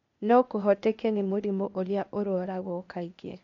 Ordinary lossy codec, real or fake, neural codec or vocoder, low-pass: MP3, 48 kbps; fake; codec, 16 kHz, 0.8 kbps, ZipCodec; 7.2 kHz